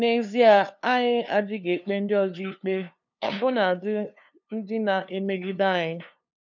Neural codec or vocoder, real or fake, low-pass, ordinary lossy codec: codec, 16 kHz, 2 kbps, FunCodec, trained on LibriTTS, 25 frames a second; fake; 7.2 kHz; none